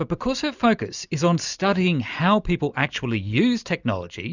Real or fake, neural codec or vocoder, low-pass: real; none; 7.2 kHz